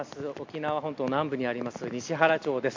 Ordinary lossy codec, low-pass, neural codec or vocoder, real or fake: MP3, 64 kbps; 7.2 kHz; none; real